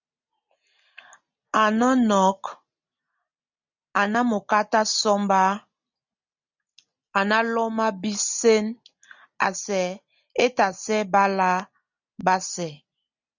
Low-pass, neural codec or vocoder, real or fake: 7.2 kHz; none; real